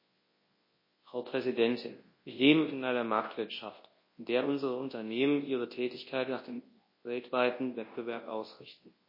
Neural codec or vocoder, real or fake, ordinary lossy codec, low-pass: codec, 24 kHz, 0.9 kbps, WavTokenizer, large speech release; fake; MP3, 24 kbps; 5.4 kHz